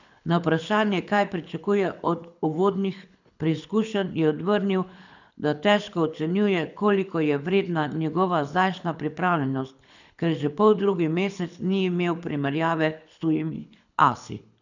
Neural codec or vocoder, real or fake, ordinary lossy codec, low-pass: codec, 24 kHz, 6 kbps, HILCodec; fake; none; 7.2 kHz